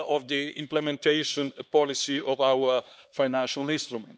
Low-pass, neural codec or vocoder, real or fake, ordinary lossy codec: none; codec, 16 kHz, 2 kbps, X-Codec, HuBERT features, trained on LibriSpeech; fake; none